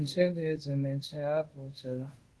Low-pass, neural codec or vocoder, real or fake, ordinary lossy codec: 10.8 kHz; codec, 24 kHz, 0.5 kbps, DualCodec; fake; Opus, 16 kbps